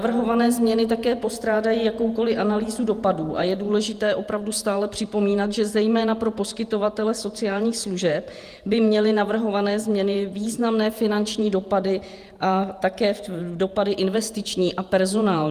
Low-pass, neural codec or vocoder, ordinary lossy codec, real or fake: 14.4 kHz; vocoder, 48 kHz, 128 mel bands, Vocos; Opus, 32 kbps; fake